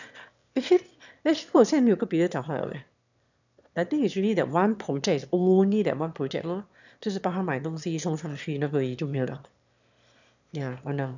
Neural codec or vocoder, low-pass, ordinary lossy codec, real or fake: autoencoder, 22.05 kHz, a latent of 192 numbers a frame, VITS, trained on one speaker; 7.2 kHz; none; fake